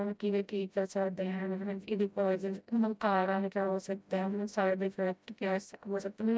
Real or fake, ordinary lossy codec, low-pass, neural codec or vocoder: fake; none; none; codec, 16 kHz, 0.5 kbps, FreqCodec, smaller model